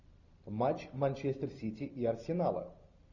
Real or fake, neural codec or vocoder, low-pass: real; none; 7.2 kHz